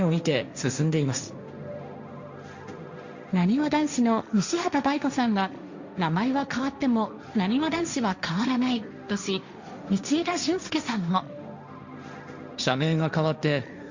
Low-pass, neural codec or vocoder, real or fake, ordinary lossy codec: 7.2 kHz; codec, 16 kHz, 1.1 kbps, Voila-Tokenizer; fake; Opus, 64 kbps